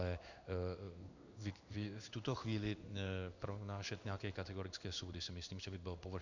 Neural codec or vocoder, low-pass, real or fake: codec, 16 kHz in and 24 kHz out, 1 kbps, XY-Tokenizer; 7.2 kHz; fake